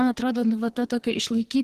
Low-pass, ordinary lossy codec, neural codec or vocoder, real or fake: 14.4 kHz; Opus, 16 kbps; codec, 44.1 kHz, 2.6 kbps, SNAC; fake